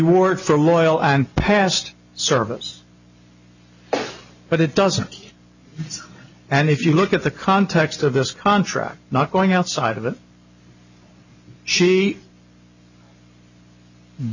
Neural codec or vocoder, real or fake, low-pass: none; real; 7.2 kHz